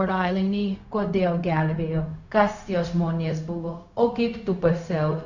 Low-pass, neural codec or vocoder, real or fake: 7.2 kHz; codec, 16 kHz, 0.4 kbps, LongCat-Audio-Codec; fake